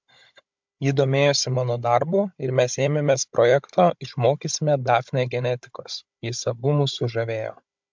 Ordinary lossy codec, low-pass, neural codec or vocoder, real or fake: MP3, 64 kbps; 7.2 kHz; codec, 16 kHz, 16 kbps, FunCodec, trained on Chinese and English, 50 frames a second; fake